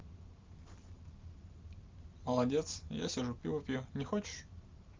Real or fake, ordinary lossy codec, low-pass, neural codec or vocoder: real; Opus, 32 kbps; 7.2 kHz; none